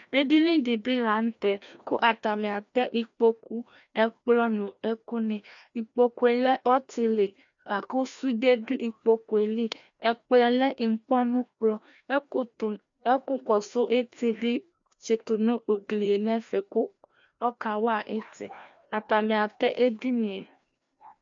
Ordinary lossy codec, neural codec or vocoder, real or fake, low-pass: MP3, 64 kbps; codec, 16 kHz, 1 kbps, FreqCodec, larger model; fake; 7.2 kHz